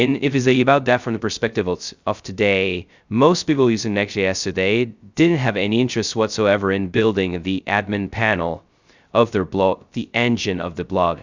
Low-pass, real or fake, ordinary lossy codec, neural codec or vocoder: 7.2 kHz; fake; Opus, 64 kbps; codec, 16 kHz, 0.2 kbps, FocalCodec